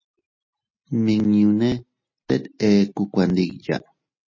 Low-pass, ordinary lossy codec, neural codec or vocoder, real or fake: 7.2 kHz; MP3, 32 kbps; none; real